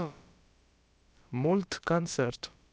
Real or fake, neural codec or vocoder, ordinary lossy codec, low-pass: fake; codec, 16 kHz, about 1 kbps, DyCAST, with the encoder's durations; none; none